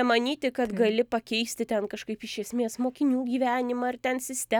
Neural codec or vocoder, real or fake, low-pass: none; real; 19.8 kHz